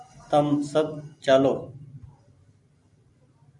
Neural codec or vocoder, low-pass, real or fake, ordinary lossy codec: vocoder, 44.1 kHz, 128 mel bands every 512 samples, BigVGAN v2; 10.8 kHz; fake; MP3, 96 kbps